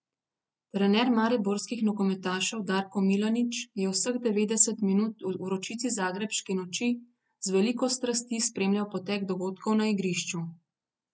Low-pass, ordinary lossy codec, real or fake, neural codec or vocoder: none; none; real; none